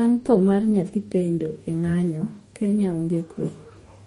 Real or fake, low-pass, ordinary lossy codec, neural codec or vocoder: fake; 19.8 kHz; MP3, 48 kbps; codec, 44.1 kHz, 2.6 kbps, DAC